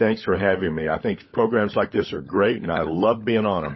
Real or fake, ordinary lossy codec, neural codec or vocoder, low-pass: fake; MP3, 24 kbps; codec, 16 kHz, 16 kbps, FunCodec, trained on LibriTTS, 50 frames a second; 7.2 kHz